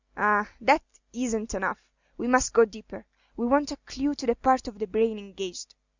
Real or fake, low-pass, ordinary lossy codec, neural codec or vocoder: real; 7.2 kHz; Opus, 64 kbps; none